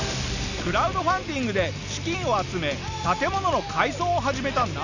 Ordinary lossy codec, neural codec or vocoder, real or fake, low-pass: none; none; real; 7.2 kHz